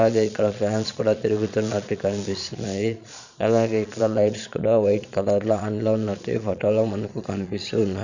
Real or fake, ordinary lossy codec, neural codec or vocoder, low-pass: fake; none; vocoder, 22.05 kHz, 80 mel bands, Vocos; 7.2 kHz